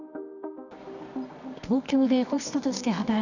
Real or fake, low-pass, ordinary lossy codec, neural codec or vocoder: fake; 7.2 kHz; none; codec, 24 kHz, 0.9 kbps, WavTokenizer, medium music audio release